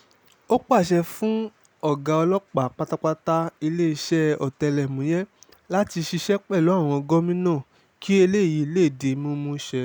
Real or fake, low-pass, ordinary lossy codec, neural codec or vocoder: real; none; none; none